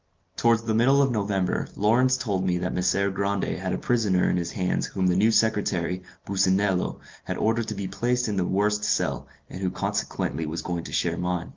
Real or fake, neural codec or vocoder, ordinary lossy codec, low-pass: real; none; Opus, 24 kbps; 7.2 kHz